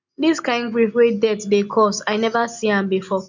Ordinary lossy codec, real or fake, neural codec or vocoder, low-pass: none; real; none; 7.2 kHz